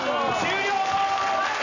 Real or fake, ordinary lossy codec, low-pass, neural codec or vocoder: real; none; 7.2 kHz; none